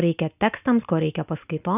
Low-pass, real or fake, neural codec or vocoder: 3.6 kHz; real; none